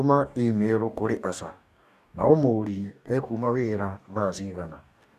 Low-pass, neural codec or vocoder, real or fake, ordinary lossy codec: 14.4 kHz; codec, 44.1 kHz, 2.6 kbps, DAC; fake; none